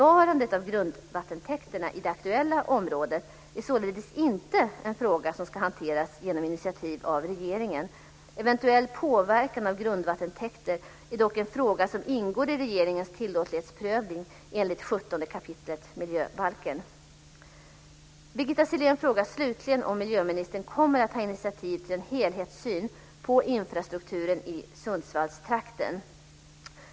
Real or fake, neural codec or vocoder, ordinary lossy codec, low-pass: real; none; none; none